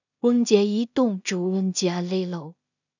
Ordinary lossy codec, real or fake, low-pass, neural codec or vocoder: none; fake; 7.2 kHz; codec, 16 kHz in and 24 kHz out, 0.4 kbps, LongCat-Audio-Codec, two codebook decoder